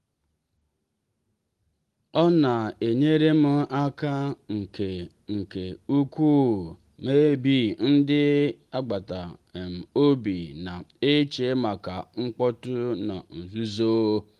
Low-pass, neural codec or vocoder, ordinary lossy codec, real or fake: 14.4 kHz; none; Opus, 24 kbps; real